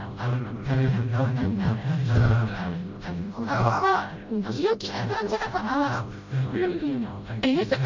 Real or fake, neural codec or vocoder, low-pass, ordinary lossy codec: fake; codec, 16 kHz, 0.5 kbps, FreqCodec, smaller model; 7.2 kHz; MP3, 48 kbps